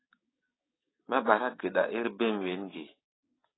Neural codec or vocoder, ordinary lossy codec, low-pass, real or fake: codec, 16 kHz, 6 kbps, DAC; AAC, 16 kbps; 7.2 kHz; fake